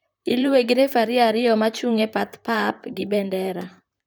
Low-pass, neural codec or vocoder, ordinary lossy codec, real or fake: none; vocoder, 44.1 kHz, 128 mel bands, Pupu-Vocoder; none; fake